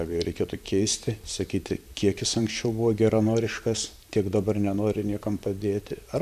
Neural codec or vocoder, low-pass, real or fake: vocoder, 44.1 kHz, 128 mel bands, Pupu-Vocoder; 14.4 kHz; fake